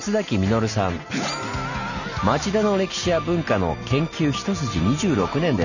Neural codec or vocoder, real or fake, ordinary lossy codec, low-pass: none; real; none; 7.2 kHz